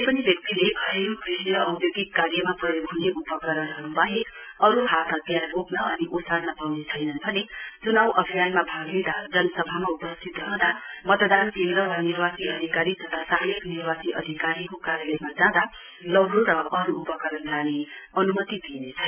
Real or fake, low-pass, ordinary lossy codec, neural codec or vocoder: real; 3.6 kHz; none; none